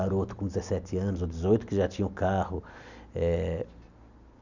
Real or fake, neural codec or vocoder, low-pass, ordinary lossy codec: real; none; 7.2 kHz; none